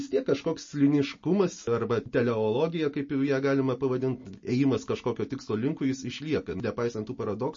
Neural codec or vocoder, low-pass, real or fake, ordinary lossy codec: none; 7.2 kHz; real; MP3, 32 kbps